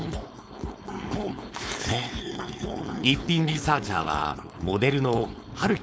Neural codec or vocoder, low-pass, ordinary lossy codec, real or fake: codec, 16 kHz, 4.8 kbps, FACodec; none; none; fake